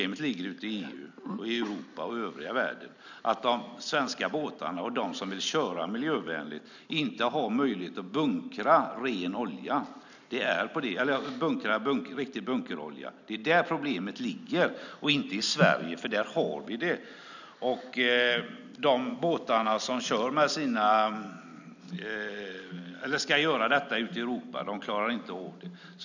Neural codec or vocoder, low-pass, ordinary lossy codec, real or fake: none; 7.2 kHz; none; real